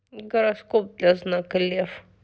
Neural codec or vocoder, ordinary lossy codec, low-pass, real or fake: none; none; none; real